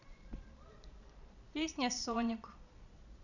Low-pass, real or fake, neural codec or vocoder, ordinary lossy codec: 7.2 kHz; fake; vocoder, 22.05 kHz, 80 mel bands, Vocos; none